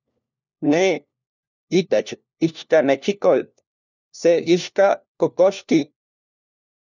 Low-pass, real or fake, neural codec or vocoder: 7.2 kHz; fake; codec, 16 kHz, 1 kbps, FunCodec, trained on LibriTTS, 50 frames a second